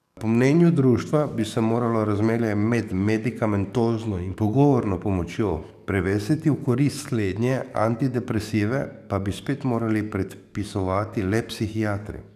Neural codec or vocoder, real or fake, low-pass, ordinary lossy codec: codec, 44.1 kHz, 7.8 kbps, DAC; fake; 14.4 kHz; none